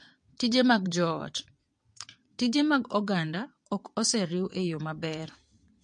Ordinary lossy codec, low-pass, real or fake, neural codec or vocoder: MP3, 48 kbps; 9.9 kHz; fake; vocoder, 22.05 kHz, 80 mel bands, WaveNeXt